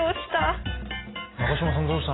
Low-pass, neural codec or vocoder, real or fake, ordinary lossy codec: 7.2 kHz; none; real; AAC, 16 kbps